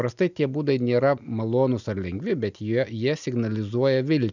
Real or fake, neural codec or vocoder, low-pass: real; none; 7.2 kHz